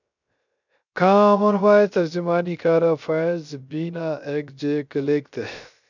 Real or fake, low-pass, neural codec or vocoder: fake; 7.2 kHz; codec, 16 kHz, 0.3 kbps, FocalCodec